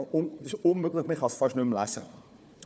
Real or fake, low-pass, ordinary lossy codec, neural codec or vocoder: fake; none; none; codec, 16 kHz, 4 kbps, FunCodec, trained on Chinese and English, 50 frames a second